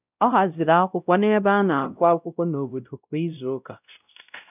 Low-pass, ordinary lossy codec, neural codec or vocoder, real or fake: 3.6 kHz; none; codec, 16 kHz, 0.5 kbps, X-Codec, WavLM features, trained on Multilingual LibriSpeech; fake